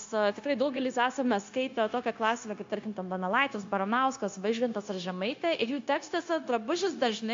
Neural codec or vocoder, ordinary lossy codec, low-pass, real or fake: codec, 16 kHz, 0.9 kbps, LongCat-Audio-Codec; MP3, 48 kbps; 7.2 kHz; fake